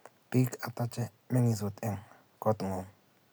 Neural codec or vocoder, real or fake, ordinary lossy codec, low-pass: none; real; none; none